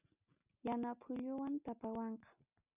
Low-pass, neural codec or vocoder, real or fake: 3.6 kHz; none; real